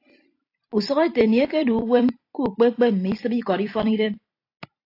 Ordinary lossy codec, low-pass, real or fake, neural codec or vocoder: AAC, 32 kbps; 5.4 kHz; fake; vocoder, 44.1 kHz, 128 mel bands every 256 samples, BigVGAN v2